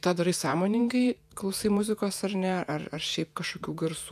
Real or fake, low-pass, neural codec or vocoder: fake; 14.4 kHz; vocoder, 48 kHz, 128 mel bands, Vocos